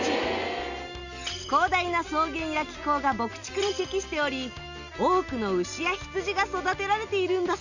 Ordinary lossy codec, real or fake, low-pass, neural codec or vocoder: none; real; 7.2 kHz; none